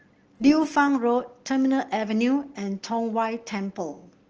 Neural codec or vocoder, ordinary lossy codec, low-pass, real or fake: none; Opus, 16 kbps; 7.2 kHz; real